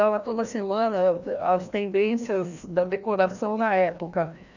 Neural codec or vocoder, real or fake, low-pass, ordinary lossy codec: codec, 16 kHz, 1 kbps, FreqCodec, larger model; fake; 7.2 kHz; none